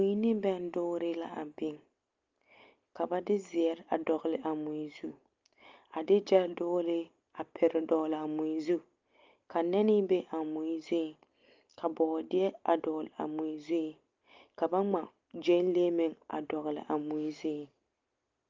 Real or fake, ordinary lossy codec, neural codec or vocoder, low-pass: real; Opus, 32 kbps; none; 7.2 kHz